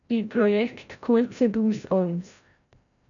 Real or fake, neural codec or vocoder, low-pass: fake; codec, 16 kHz, 0.5 kbps, FreqCodec, larger model; 7.2 kHz